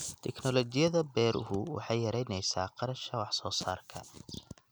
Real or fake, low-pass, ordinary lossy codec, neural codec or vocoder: real; none; none; none